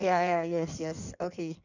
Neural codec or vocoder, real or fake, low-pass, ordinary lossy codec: codec, 16 kHz in and 24 kHz out, 1.1 kbps, FireRedTTS-2 codec; fake; 7.2 kHz; none